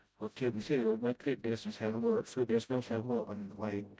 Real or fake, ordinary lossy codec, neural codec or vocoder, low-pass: fake; none; codec, 16 kHz, 0.5 kbps, FreqCodec, smaller model; none